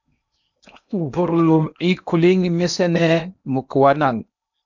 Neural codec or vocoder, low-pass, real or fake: codec, 16 kHz in and 24 kHz out, 0.8 kbps, FocalCodec, streaming, 65536 codes; 7.2 kHz; fake